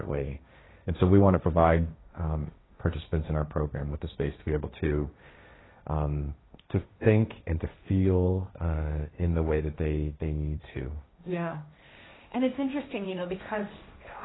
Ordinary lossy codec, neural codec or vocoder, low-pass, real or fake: AAC, 16 kbps; codec, 16 kHz, 1.1 kbps, Voila-Tokenizer; 7.2 kHz; fake